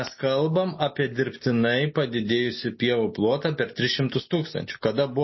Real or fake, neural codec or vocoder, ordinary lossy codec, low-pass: real; none; MP3, 24 kbps; 7.2 kHz